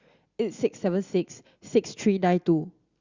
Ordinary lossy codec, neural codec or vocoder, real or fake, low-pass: Opus, 64 kbps; none; real; 7.2 kHz